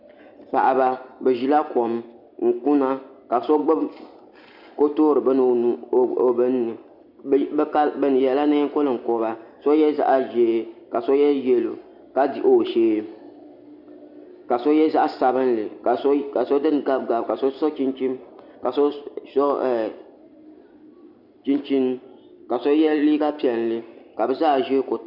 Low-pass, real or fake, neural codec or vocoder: 5.4 kHz; real; none